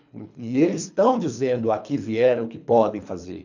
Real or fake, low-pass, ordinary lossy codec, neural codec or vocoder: fake; 7.2 kHz; none; codec, 24 kHz, 3 kbps, HILCodec